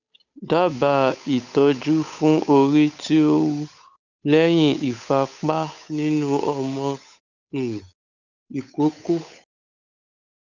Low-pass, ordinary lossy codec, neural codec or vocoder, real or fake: 7.2 kHz; none; codec, 16 kHz, 8 kbps, FunCodec, trained on Chinese and English, 25 frames a second; fake